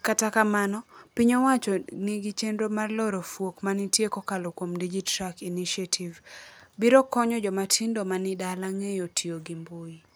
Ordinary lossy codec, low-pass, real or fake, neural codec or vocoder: none; none; real; none